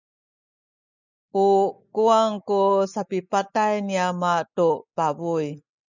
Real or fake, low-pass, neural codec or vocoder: real; 7.2 kHz; none